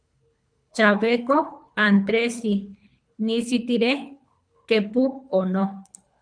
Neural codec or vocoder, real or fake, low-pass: codec, 24 kHz, 3 kbps, HILCodec; fake; 9.9 kHz